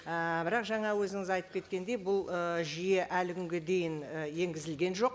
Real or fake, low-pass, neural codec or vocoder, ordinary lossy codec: real; none; none; none